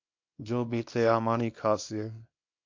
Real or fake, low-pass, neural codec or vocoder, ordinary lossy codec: fake; 7.2 kHz; codec, 24 kHz, 0.9 kbps, WavTokenizer, small release; MP3, 48 kbps